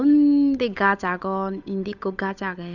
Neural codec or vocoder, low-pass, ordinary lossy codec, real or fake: codec, 16 kHz, 8 kbps, FunCodec, trained on Chinese and English, 25 frames a second; 7.2 kHz; none; fake